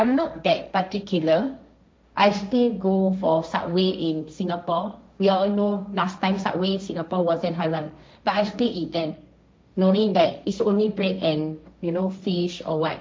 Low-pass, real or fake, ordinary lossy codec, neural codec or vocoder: none; fake; none; codec, 16 kHz, 1.1 kbps, Voila-Tokenizer